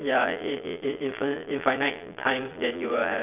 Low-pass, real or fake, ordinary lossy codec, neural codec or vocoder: 3.6 kHz; fake; none; vocoder, 22.05 kHz, 80 mel bands, Vocos